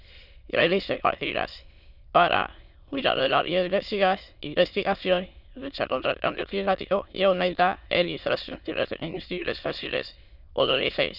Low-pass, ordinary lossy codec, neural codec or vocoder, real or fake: 5.4 kHz; none; autoencoder, 22.05 kHz, a latent of 192 numbers a frame, VITS, trained on many speakers; fake